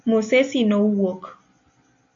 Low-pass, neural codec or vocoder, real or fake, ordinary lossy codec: 7.2 kHz; none; real; MP3, 48 kbps